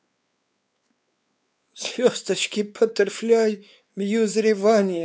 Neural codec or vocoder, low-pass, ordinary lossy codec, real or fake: codec, 16 kHz, 4 kbps, X-Codec, WavLM features, trained on Multilingual LibriSpeech; none; none; fake